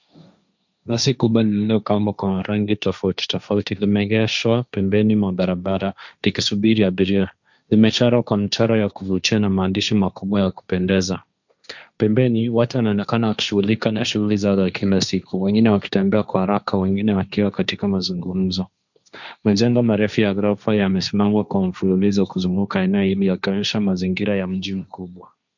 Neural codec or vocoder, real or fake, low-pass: codec, 16 kHz, 1.1 kbps, Voila-Tokenizer; fake; 7.2 kHz